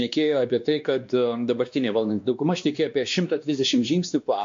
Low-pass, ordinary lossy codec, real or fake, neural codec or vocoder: 7.2 kHz; MP3, 48 kbps; fake; codec, 16 kHz, 2 kbps, X-Codec, WavLM features, trained on Multilingual LibriSpeech